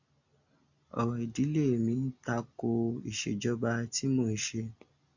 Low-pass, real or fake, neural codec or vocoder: 7.2 kHz; real; none